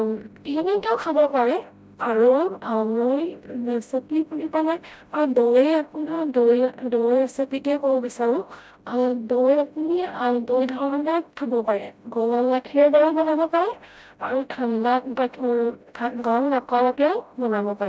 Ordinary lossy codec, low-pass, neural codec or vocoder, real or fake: none; none; codec, 16 kHz, 0.5 kbps, FreqCodec, smaller model; fake